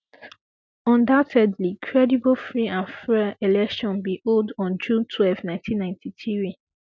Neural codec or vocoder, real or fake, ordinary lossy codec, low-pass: none; real; none; none